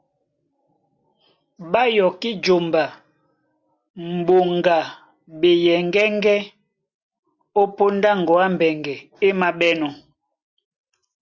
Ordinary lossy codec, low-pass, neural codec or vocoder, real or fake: Opus, 64 kbps; 7.2 kHz; none; real